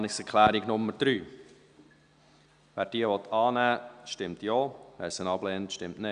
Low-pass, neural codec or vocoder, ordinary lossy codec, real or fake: 9.9 kHz; none; Opus, 64 kbps; real